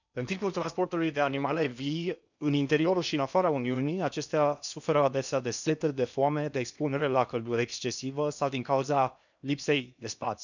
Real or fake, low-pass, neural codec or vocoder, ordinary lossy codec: fake; 7.2 kHz; codec, 16 kHz in and 24 kHz out, 0.8 kbps, FocalCodec, streaming, 65536 codes; none